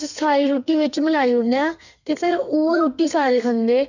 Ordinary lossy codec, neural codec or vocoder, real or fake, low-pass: none; codec, 32 kHz, 1.9 kbps, SNAC; fake; 7.2 kHz